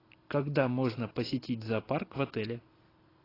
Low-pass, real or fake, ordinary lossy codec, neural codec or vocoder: 5.4 kHz; real; AAC, 24 kbps; none